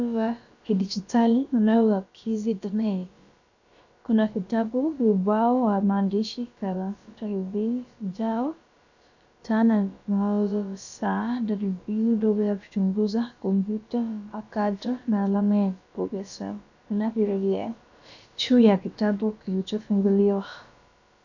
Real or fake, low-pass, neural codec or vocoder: fake; 7.2 kHz; codec, 16 kHz, about 1 kbps, DyCAST, with the encoder's durations